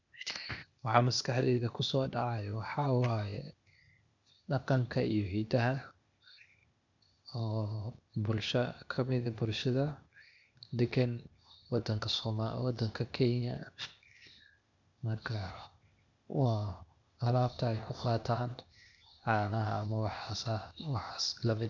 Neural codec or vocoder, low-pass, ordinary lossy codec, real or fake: codec, 16 kHz, 0.8 kbps, ZipCodec; 7.2 kHz; none; fake